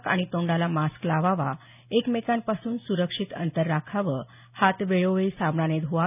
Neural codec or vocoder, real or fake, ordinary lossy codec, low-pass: none; real; none; 3.6 kHz